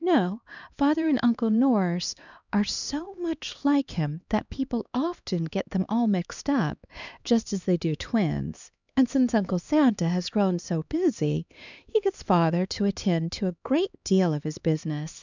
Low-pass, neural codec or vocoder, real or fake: 7.2 kHz; codec, 16 kHz, 2 kbps, X-Codec, HuBERT features, trained on LibriSpeech; fake